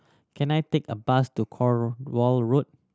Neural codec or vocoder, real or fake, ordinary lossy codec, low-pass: none; real; none; none